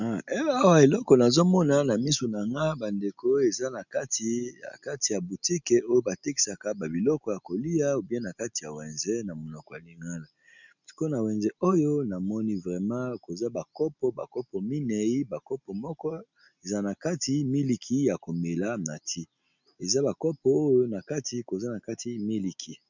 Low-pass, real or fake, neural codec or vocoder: 7.2 kHz; real; none